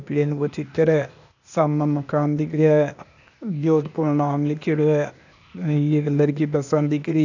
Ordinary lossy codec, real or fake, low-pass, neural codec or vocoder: none; fake; 7.2 kHz; codec, 16 kHz, 0.8 kbps, ZipCodec